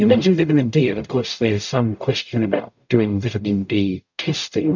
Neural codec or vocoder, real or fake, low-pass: codec, 44.1 kHz, 0.9 kbps, DAC; fake; 7.2 kHz